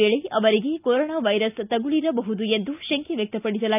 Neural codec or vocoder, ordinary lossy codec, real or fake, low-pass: none; none; real; 3.6 kHz